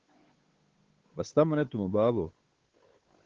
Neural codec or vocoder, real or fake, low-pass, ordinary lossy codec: codec, 16 kHz, 8 kbps, FunCodec, trained on Chinese and English, 25 frames a second; fake; 7.2 kHz; Opus, 16 kbps